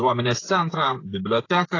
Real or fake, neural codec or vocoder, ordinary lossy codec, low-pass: fake; codec, 16 kHz, 8 kbps, FreqCodec, smaller model; AAC, 32 kbps; 7.2 kHz